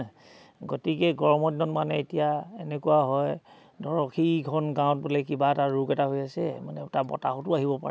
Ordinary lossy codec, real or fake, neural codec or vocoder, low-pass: none; real; none; none